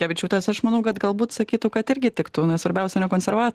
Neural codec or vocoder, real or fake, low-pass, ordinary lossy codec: none; real; 14.4 kHz; Opus, 16 kbps